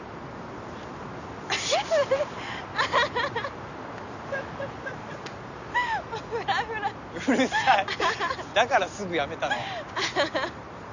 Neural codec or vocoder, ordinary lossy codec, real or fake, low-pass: none; none; real; 7.2 kHz